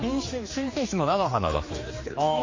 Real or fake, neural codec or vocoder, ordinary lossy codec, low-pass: fake; codec, 16 kHz, 2 kbps, X-Codec, HuBERT features, trained on general audio; MP3, 32 kbps; 7.2 kHz